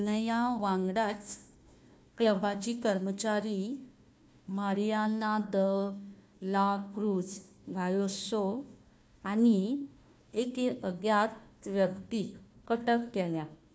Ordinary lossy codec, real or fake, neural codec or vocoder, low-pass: none; fake; codec, 16 kHz, 1 kbps, FunCodec, trained on Chinese and English, 50 frames a second; none